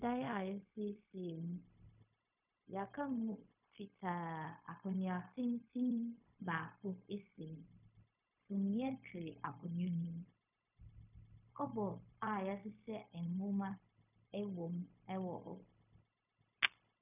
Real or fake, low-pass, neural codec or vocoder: fake; 3.6 kHz; codec, 16 kHz, 0.4 kbps, LongCat-Audio-Codec